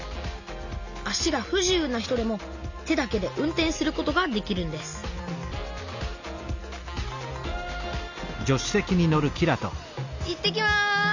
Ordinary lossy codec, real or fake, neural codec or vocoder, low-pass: none; real; none; 7.2 kHz